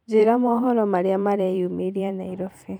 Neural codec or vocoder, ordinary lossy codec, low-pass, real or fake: vocoder, 44.1 kHz, 128 mel bands every 512 samples, BigVGAN v2; none; 19.8 kHz; fake